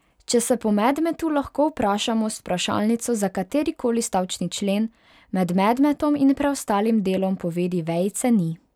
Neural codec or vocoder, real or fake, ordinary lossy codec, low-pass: none; real; none; 19.8 kHz